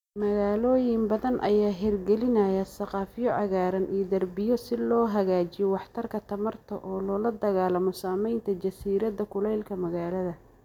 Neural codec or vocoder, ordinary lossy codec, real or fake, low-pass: none; none; real; 19.8 kHz